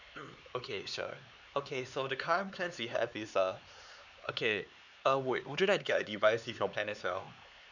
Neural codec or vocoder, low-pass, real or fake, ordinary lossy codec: codec, 16 kHz, 4 kbps, X-Codec, HuBERT features, trained on LibriSpeech; 7.2 kHz; fake; none